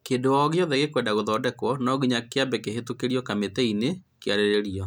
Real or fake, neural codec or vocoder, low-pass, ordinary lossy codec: real; none; 19.8 kHz; none